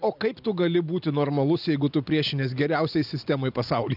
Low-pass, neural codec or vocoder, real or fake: 5.4 kHz; none; real